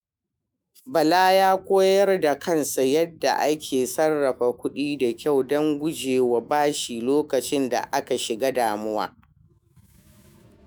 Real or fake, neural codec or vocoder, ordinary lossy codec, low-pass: fake; autoencoder, 48 kHz, 128 numbers a frame, DAC-VAE, trained on Japanese speech; none; none